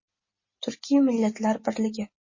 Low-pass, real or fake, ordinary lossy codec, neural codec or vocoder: 7.2 kHz; real; MP3, 32 kbps; none